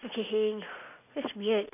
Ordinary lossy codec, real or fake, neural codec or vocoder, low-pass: none; real; none; 3.6 kHz